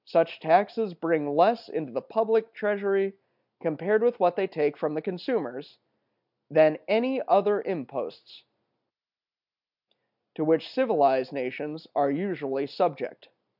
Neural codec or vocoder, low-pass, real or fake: none; 5.4 kHz; real